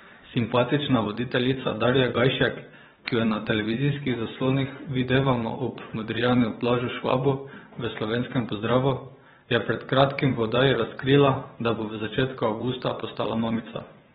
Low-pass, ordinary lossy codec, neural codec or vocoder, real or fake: 9.9 kHz; AAC, 16 kbps; vocoder, 22.05 kHz, 80 mel bands, WaveNeXt; fake